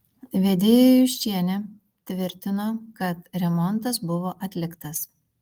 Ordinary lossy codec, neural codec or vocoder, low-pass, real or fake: Opus, 24 kbps; none; 19.8 kHz; real